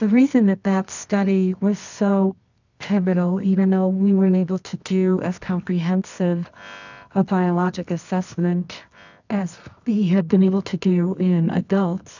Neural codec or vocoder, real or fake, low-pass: codec, 24 kHz, 0.9 kbps, WavTokenizer, medium music audio release; fake; 7.2 kHz